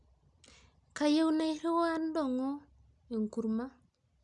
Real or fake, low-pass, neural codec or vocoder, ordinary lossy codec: real; 10.8 kHz; none; none